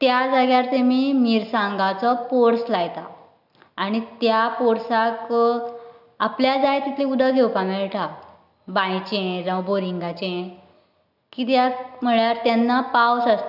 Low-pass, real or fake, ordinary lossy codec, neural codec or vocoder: 5.4 kHz; real; none; none